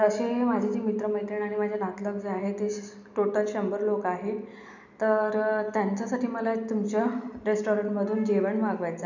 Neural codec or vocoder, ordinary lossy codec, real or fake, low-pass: none; none; real; 7.2 kHz